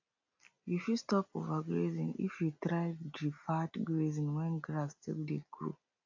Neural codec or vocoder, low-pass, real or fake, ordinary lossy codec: none; 7.2 kHz; real; AAC, 48 kbps